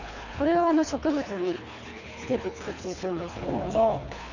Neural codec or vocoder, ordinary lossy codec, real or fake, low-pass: codec, 24 kHz, 3 kbps, HILCodec; none; fake; 7.2 kHz